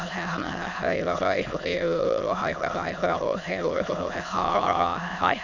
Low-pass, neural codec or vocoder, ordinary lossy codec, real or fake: 7.2 kHz; autoencoder, 22.05 kHz, a latent of 192 numbers a frame, VITS, trained on many speakers; none; fake